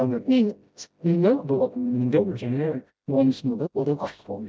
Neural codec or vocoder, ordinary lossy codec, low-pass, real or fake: codec, 16 kHz, 0.5 kbps, FreqCodec, smaller model; none; none; fake